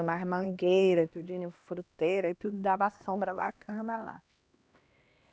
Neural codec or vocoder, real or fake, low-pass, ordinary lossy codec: codec, 16 kHz, 1 kbps, X-Codec, HuBERT features, trained on LibriSpeech; fake; none; none